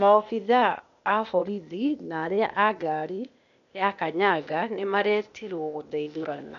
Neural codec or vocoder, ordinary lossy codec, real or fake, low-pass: codec, 16 kHz, 0.8 kbps, ZipCodec; none; fake; 7.2 kHz